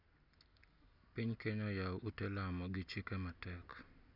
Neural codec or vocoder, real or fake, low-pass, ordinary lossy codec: none; real; 5.4 kHz; none